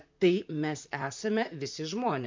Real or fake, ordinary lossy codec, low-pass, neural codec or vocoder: fake; MP3, 64 kbps; 7.2 kHz; vocoder, 44.1 kHz, 128 mel bands, Pupu-Vocoder